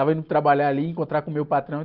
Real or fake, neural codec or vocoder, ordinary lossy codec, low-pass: real; none; Opus, 24 kbps; 5.4 kHz